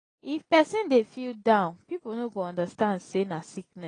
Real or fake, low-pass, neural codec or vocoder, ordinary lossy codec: real; 9.9 kHz; none; AAC, 32 kbps